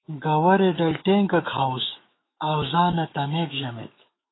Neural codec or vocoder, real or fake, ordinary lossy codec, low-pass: autoencoder, 48 kHz, 128 numbers a frame, DAC-VAE, trained on Japanese speech; fake; AAC, 16 kbps; 7.2 kHz